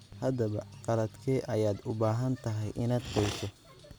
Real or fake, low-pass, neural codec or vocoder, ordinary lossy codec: real; none; none; none